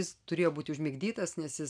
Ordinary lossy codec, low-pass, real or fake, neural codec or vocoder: MP3, 96 kbps; 9.9 kHz; real; none